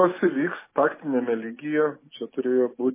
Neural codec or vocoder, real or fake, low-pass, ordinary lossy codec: none; real; 3.6 kHz; MP3, 16 kbps